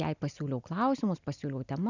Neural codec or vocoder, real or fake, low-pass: none; real; 7.2 kHz